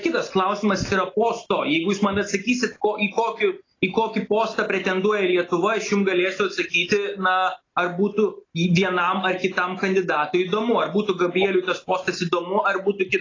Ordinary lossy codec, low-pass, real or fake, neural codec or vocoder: AAC, 32 kbps; 7.2 kHz; real; none